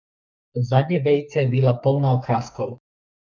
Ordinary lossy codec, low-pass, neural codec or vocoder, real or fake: MP3, 64 kbps; 7.2 kHz; codec, 44.1 kHz, 2.6 kbps, SNAC; fake